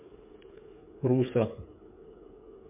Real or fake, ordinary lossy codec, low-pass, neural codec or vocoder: fake; MP3, 24 kbps; 3.6 kHz; codec, 16 kHz, 8 kbps, FreqCodec, smaller model